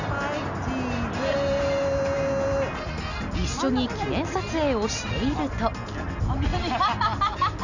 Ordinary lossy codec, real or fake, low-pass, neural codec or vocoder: none; real; 7.2 kHz; none